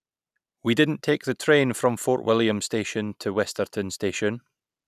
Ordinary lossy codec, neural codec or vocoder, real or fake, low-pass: none; none; real; 14.4 kHz